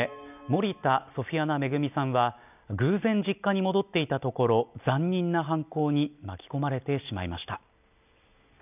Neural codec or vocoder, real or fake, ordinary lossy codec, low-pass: none; real; none; 3.6 kHz